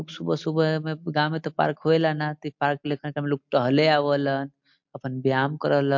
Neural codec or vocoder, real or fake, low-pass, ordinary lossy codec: none; real; 7.2 kHz; MP3, 48 kbps